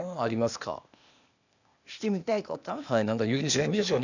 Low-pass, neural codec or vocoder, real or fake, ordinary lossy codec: 7.2 kHz; codec, 16 kHz, 0.8 kbps, ZipCodec; fake; none